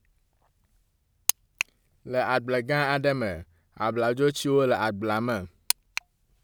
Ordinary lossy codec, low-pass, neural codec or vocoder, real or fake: none; none; none; real